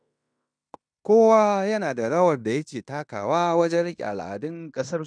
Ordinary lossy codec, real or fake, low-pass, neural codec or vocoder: none; fake; 9.9 kHz; codec, 16 kHz in and 24 kHz out, 0.9 kbps, LongCat-Audio-Codec, fine tuned four codebook decoder